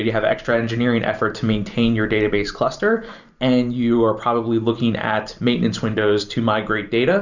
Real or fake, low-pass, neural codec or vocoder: fake; 7.2 kHz; vocoder, 44.1 kHz, 128 mel bands every 512 samples, BigVGAN v2